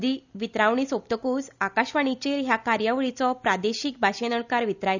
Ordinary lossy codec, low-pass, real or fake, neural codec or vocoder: none; 7.2 kHz; real; none